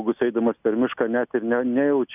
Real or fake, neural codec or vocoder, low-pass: real; none; 3.6 kHz